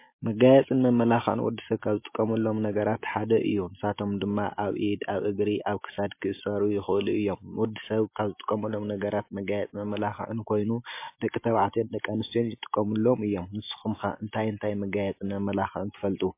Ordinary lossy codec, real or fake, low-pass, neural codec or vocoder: MP3, 24 kbps; real; 3.6 kHz; none